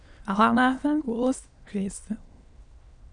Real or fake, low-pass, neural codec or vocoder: fake; 9.9 kHz; autoencoder, 22.05 kHz, a latent of 192 numbers a frame, VITS, trained on many speakers